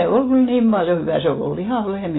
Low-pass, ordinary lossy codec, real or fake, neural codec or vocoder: 7.2 kHz; AAC, 16 kbps; real; none